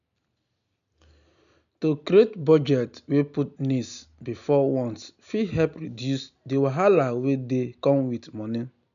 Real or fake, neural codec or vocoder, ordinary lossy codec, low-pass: real; none; none; 7.2 kHz